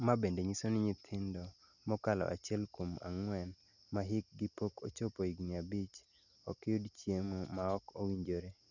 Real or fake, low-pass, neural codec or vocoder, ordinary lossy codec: real; 7.2 kHz; none; none